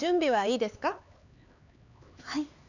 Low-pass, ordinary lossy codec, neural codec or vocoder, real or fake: 7.2 kHz; none; codec, 16 kHz, 4 kbps, X-Codec, HuBERT features, trained on LibriSpeech; fake